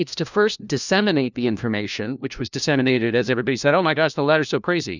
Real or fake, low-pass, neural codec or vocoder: fake; 7.2 kHz; codec, 16 kHz, 1 kbps, FunCodec, trained on LibriTTS, 50 frames a second